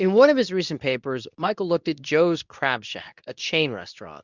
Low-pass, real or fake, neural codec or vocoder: 7.2 kHz; fake; codec, 24 kHz, 0.9 kbps, WavTokenizer, medium speech release version 2